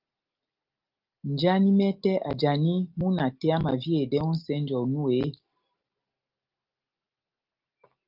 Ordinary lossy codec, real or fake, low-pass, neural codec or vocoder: Opus, 32 kbps; real; 5.4 kHz; none